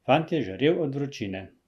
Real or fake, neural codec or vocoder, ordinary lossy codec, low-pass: real; none; Opus, 64 kbps; 14.4 kHz